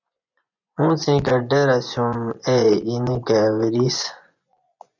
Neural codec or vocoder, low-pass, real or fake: vocoder, 24 kHz, 100 mel bands, Vocos; 7.2 kHz; fake